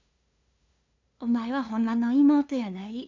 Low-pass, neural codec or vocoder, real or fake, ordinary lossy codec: 7.2 kHz; codec, 16 kHz, 2 kbps, FunCodec, trained on LibriTTS, 25 frames a second; fake; MP3, 64 kbps